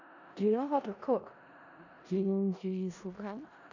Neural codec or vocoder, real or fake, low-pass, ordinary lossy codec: codec, 16 kHz in and 24 kHz out, 0.4 kbps, LongCat-Audio-Codec, four codebook decoder; fake; 7.2 kHz; none